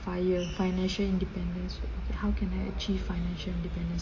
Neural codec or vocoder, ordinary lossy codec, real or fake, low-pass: none; MP3, 32 kbps; real; 7.2 kHz